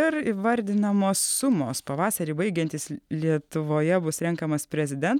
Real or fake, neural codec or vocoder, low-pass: real; none; 19.8 kHz